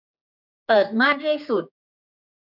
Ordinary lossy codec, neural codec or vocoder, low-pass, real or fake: none; codec, 16 kHz, 4 kbps, X-Codec, HuBERT features, trained on general audio; 5.4 kHz; fake